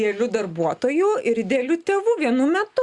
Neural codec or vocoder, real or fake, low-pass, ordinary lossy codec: none; real; 10.8 kHz; Opus, 64 kbps